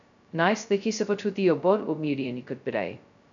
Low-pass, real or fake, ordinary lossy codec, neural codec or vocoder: 7.2 kHz; fake; none; codec, 16 kHz, 0.2 kbps, FocalCodec